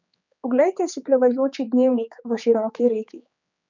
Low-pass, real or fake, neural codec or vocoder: 7.2 kHz; fake; codec, 16 kHz, 4 kbps, X-Codec, HuBERT features, trained on general audio